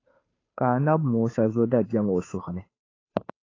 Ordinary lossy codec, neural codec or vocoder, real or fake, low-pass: AAC, 32 kbps; codec, 16 kHz, 2 kbps, FunCodec, trained on Chinese and English, 25 frames a second; fake; 7.2 kHz